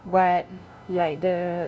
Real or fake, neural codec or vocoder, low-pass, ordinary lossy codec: fake; codec, 16 kHz, 0.5 kbps, FunCodec, trained on LibriTTS, 25 frames a second; none; none